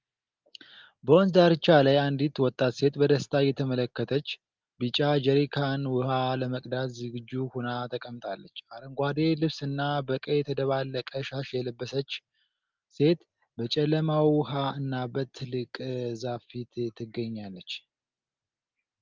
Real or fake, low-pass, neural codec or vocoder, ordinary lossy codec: real; 7.2 kHz; none; Opus, 32 kbps